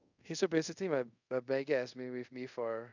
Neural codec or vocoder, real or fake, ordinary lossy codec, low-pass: codec, 24 kHz, 0.5 kbps, DualCodec; fake; none; 7.2 kHz